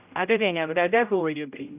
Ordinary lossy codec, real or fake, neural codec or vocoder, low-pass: none; fake; codec, 16 kHz, 0.5 kbps, X-Codec, HuBERT features, trained on general audio; 3.6 kHz